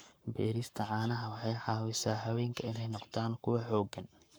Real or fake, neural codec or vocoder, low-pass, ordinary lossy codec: fake; codec, 44.1 kHz, 7.8 kbps, Pupu-Codec; none; none